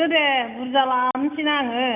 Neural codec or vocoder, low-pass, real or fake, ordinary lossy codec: none; 3.6 kHz; real; none